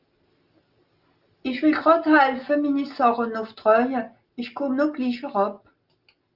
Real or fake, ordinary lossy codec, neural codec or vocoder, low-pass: real; Opus, 24 kbps; none; 5.4 kHz